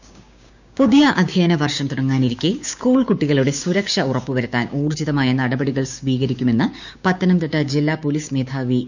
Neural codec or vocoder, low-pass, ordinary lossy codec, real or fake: codec, 16 kHz, 6 kbps, DAC; 7.2 kHz; none; fake